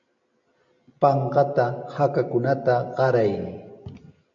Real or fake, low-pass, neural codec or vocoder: real; 7.2 kHz; none